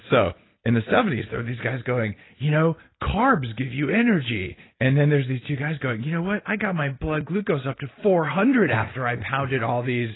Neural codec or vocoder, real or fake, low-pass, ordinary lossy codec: none; real; 7.2 kHz; AAC, 16 kbps